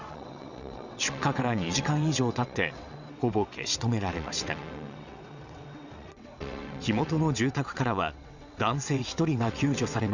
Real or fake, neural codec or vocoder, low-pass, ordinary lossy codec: fake; vocoder, 22.05 kHz, 80 mel bands, WaveNeXt; 7.2 kHz; none